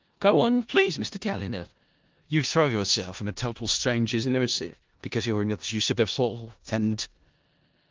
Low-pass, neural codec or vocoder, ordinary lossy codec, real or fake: 7.2 kHz; codec, 16 kHz in and 24 kHz out, 0.4 kbps, LongCat-Audio-Codec, four codebook decoder; Opus, 24 kbps; fake